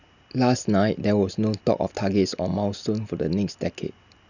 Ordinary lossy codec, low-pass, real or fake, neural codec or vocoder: none; 7.2 kHz; real; none